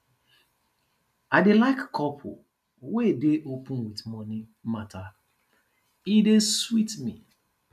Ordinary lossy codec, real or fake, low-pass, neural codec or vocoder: none; real; 14.4 kHz; none